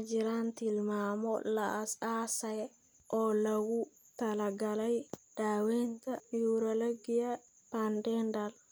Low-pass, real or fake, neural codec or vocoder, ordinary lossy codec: none; real; none; none